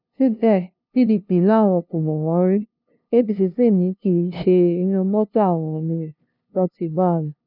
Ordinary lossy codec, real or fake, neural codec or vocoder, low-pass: none; fake; codec, 16 kHz, 0.5 kbps, FunCodec, trained on LibriTTS, 25 frames a second; 5.4 kHz